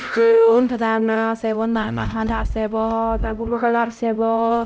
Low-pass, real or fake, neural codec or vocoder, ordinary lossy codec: none; fake; codec, 16 kHz, 0.5 kbps, X-Codec, HuBERT features, trained on LibriSpeech; none